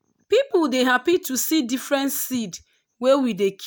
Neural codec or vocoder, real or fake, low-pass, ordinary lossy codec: none; real; none; none